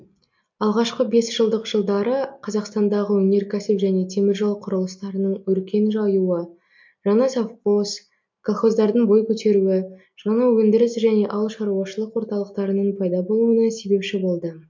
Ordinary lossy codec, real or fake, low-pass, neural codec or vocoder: MP3, 48 kbps; real; 7.2 kHz; none